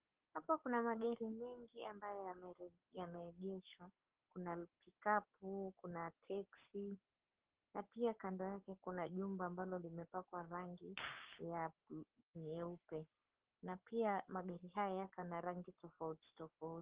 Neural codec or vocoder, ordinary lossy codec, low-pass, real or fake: codec, 44.1 kHz, 7.8 kbps, Pupu-Codec; Opus, 16 kbps; 3.6 kHz; fake